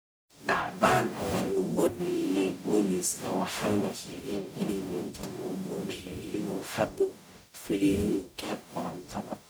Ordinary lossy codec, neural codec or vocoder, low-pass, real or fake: none; codec, 44.1 kHz, 0.9 kbps, DAC; none; fake